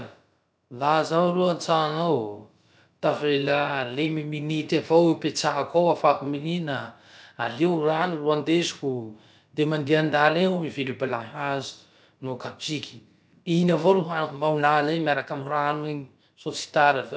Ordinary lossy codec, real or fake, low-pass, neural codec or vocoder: none; fake; none; codec, 16 kHz, about 1 kbps, DyCAST, with the encoder's durations